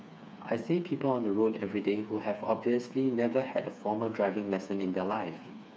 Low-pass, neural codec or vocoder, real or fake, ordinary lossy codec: none; codec, 16 kHz, 4 kbps, FreqCodec, smaller model; fake; none